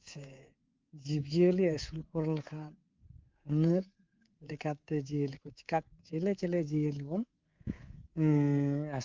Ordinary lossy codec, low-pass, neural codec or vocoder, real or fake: Opus, 24 kbps; 7.2 kHz; codec, 24 kHz, 3.1 kbps, DualCodec; fake